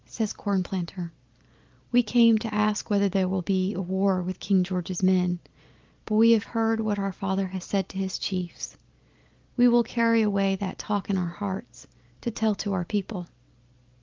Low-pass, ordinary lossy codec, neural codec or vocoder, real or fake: 7.2 kHz; Opus, 32 kbps; none; real